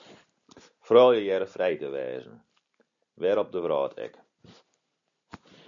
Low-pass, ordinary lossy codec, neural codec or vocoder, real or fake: 7.2 kHz; MP3, 96 kbps; none; real